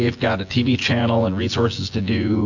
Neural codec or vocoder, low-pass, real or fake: vocoder, 24 kHz, 100 mel bands, Vocos; 7.2 kHz; fake